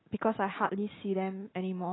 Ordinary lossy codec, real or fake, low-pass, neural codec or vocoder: AAC, 16 kbps; real; 7.2 kHz; none